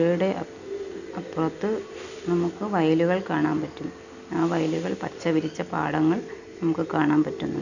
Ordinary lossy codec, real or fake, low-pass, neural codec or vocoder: none; real; 7.2 kHz; none